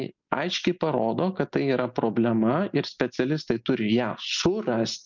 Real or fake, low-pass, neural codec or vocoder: real; 7.2 kHz; none